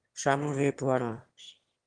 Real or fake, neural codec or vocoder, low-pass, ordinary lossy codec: fake; autoencoder, 22.05 kHz, a latent of 192 numbers a frame, VITS, trained on one speaker; 9.9 kHz; Opus, 24 kbps